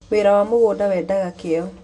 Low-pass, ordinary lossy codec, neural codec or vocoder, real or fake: 10.8 kHz; none; none; real